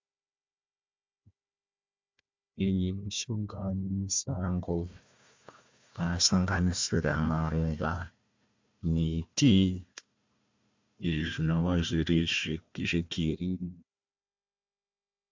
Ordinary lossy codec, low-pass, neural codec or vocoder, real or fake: MP3, 64 kbps; 7.2 kHz; codec, 16 kHz, 1 kbps, FunCodec, trained on Chinese and English, 50 frames a second; fake